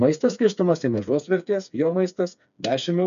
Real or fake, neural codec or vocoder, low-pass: fake; codec, 16 kHz, 4 kbps, FreqCodec, smaller model; 7.2 kHz